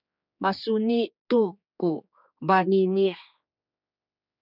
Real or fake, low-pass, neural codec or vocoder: fake; 5.4 kHz; codec, 16 kHz, 4 kbps, X-Codec, HuBERT features, trained on general audio